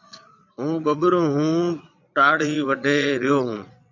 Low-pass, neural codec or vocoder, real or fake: 7.2 kHz; vocoder, 22.05 kHz, 80 mel bands, Vocos; fake